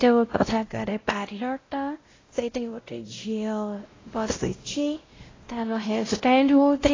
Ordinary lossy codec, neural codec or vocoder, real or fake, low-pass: AAC, 32 kbps; codec, 16 kHz, 0.5 kbps, X-Codec, WavLM features, trained on Multilingual LibriSpeech; fake; 7.2 kHz